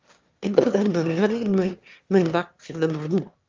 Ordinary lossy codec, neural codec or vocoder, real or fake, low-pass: Opus, 32 kbps; autoencoder, 22.05 kHz, a latent of 192 numbers a frame, VITS, trained on one speaker; fake; 7.2 kHz